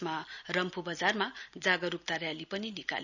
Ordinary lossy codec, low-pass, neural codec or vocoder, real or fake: none; 7.2 kHz; none; real